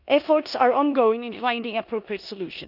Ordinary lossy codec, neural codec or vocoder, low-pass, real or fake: none; codec, 16 kHz in and 24 kHz out, 0.9 kbps, LongCat-Audio-Codec, four codebook decoder; 5.4 kHz; fake